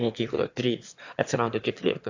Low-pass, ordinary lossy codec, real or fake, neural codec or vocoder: 7.2 kHz; AAC, 48 kbps; fake; autoencoder, 22.05 kHz, a latent of 192 numbers a frame, VITS, trained on one speaker